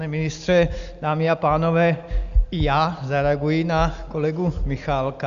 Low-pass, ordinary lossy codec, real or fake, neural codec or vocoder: 7.2 kHz; Opus, 64 kbps; real; none